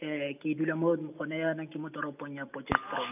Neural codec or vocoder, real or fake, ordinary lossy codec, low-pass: none; real; none; 3.6 kHz